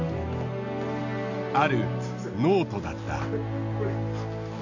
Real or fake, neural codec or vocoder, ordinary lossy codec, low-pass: real; none; none; 7.2 kHz